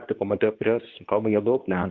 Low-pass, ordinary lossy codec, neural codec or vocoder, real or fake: 7.2 kHz; Opus, 32 kbps; codec, 24 kHz, 0.9 kbps, WavTokenizer, medium speech release version 2; fake